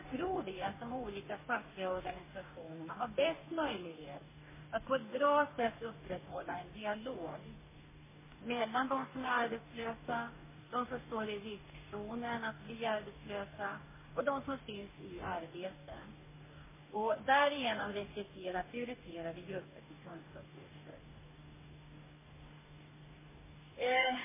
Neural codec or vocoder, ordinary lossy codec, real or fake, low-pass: codec, 44.1 kHz, 2.6 kbps, DAC; MP3, 16 kbps; fake; 3.6 kHz